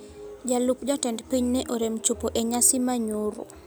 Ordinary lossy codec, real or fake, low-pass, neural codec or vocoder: none; real; none; none